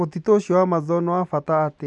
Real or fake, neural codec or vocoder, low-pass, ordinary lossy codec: real; none; 10.8 kHz; none